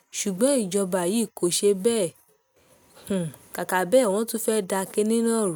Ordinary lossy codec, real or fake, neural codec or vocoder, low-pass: none; real; none; none